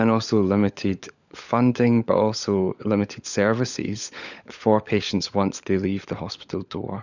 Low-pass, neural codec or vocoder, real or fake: 7.2 kHz; codec, 16 kHz, 16 kbps, FunCodec, trained on LibriTTS, 50 frames a second; fake